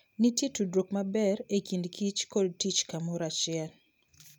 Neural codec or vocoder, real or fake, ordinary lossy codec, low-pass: none; real; none; none